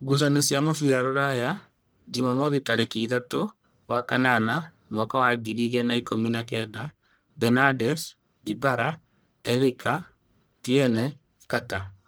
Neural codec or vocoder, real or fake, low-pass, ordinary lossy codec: codec, 44.1 kHz, 1.7 kbps, Pupu-Codec; fake; none; none